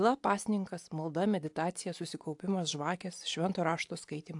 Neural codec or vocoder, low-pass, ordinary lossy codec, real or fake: none; 10.8 kHz; AAC, 64 kbps; real